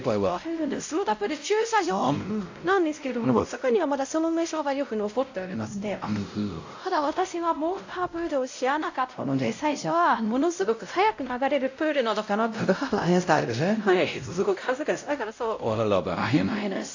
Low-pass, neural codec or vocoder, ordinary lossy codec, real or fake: 7.2 kHz; codec, 16 kHz, 0.5 kbps, X-Codec, WavLM features, trained on Multilingual LibriSpeech; AAC, 48 kbps; fake